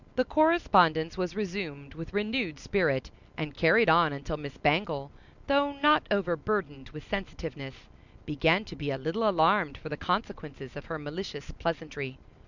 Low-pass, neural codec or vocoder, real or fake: 7.2 kHz; none; real